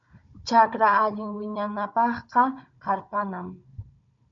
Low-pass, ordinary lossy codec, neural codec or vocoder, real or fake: 7.2 kHz; MP3, 48 kbps; codec, 16 kHz, 16 kbps, FunCodec, trained on Chinese and English, 50 frames a second; fake